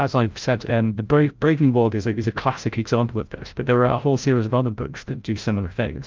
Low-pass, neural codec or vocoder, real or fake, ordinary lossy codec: 7.2 kHz; codec, 16 kHz, 0.5 kbps, FreqCodec, larger model; fake; Opus, 24 kbps